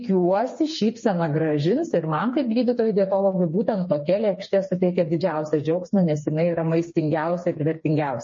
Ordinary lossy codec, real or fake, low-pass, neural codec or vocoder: MP3, 32 kbps; fake; 7.2 kHz; codec, 16 kHz, 4 kbps, FreqCodec, smaller model